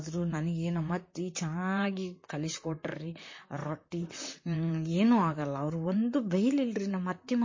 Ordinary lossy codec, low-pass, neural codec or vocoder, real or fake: MP3, 32 kbps; 7.2 kHz; vocoder, 44.1 kHz, 128 mel bands, Pupu-Vocoder; fake